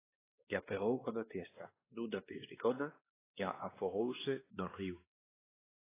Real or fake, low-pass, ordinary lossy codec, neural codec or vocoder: fake; 3.6 kHz; AAC, 16 kbps; codec, 16 kHz, 2 kbps, X-Codec, WavLM features, trained on Multilingual LibriSpeech